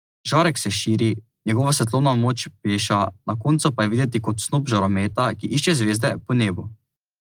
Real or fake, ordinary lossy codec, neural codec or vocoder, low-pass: real; Opus, 24 kbps; none; 19.8 kHz